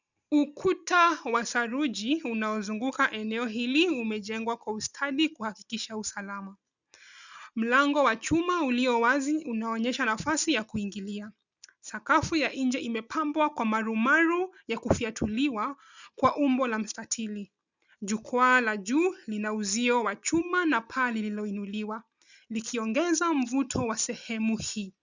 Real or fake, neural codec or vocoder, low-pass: real; none; 7.2 kHz